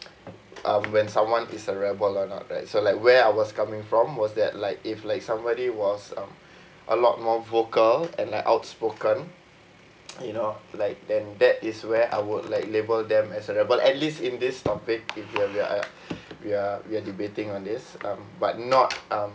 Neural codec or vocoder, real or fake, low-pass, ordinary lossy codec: none; real; none; none